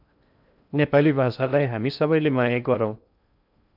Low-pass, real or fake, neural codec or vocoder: 5.4 kHz; fake; codec, 16 kHz in and 24 kHz out, 0.6 kbps, FocalCodec, streaming, 2048 codes